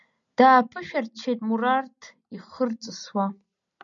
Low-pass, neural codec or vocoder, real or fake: 7.2 kHz; none; real